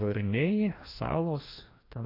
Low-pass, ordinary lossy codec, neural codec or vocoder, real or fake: 5.4 kHz; AAC, 24 kbps; codec, 16 kHz, 1 kbps, FreqCodec, larger model; fake